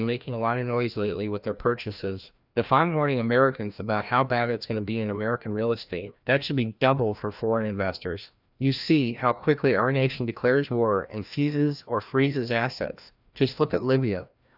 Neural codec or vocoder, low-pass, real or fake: codec, 16 kHz, 1 kbps, FreqCodec, larger model; 5.4 kHz; fake